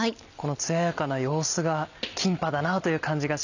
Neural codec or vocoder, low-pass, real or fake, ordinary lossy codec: none; 7.2 kHz; real; none